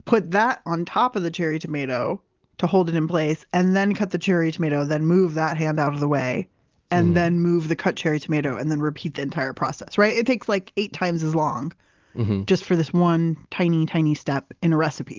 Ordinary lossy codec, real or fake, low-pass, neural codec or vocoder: Opus, 32 kbps; real; 7.2 kHz; none